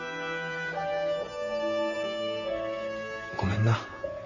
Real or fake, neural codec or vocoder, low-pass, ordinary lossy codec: fake; vocoder, 44.1 kHz, 128 mel bands, Pupu-Vocoder; 7.2 kHz; none